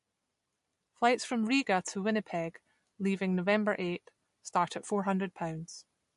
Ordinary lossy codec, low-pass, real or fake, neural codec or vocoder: MP3, 48 kbps; 14.4 kHz; real; none